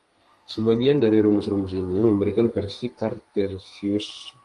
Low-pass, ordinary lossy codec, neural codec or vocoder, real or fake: 10.8 kHz; Opus, 32 kbps; codec, 32 kHz, 1.9 kbps, SNAC; fake